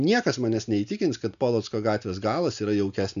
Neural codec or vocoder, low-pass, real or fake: none; 7.2 kHz; real